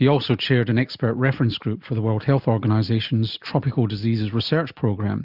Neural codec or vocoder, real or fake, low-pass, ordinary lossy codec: none; real; 5.4 kHz; Opus, 64 kbps